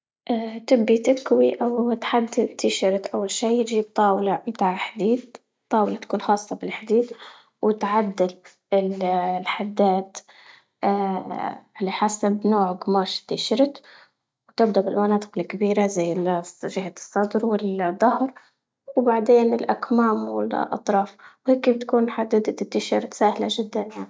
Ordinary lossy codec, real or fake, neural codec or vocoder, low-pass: none; real; none; none